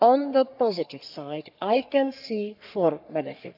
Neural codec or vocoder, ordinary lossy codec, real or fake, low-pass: codec, 44.1 kHz, 3.4 kbps, Pupu-Codec; none; fake; 5.4 kHz